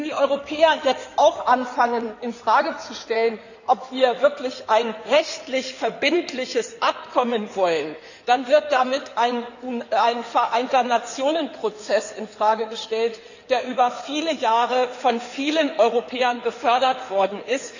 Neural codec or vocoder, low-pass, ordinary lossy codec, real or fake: codec, 16 kHz in and 24 kHz out, 2.2 kbps, FireRedTTS-2 codec; 7.2 kHz; none; fake